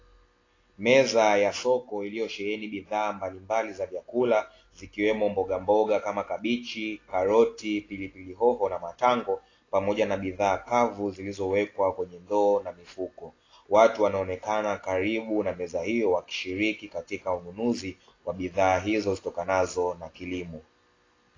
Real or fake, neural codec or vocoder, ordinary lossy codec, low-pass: real; none; AAC, 32 kbps; 7.2 kHz